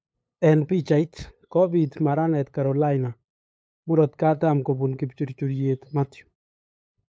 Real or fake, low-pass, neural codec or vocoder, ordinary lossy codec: fake; none; codec, 16 kHz, 8 kbps, FunCodec, trained on LibriTTS, 25 frames a second; none